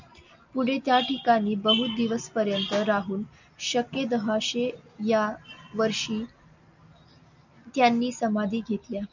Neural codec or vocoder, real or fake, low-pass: none; real; 7.2 kHz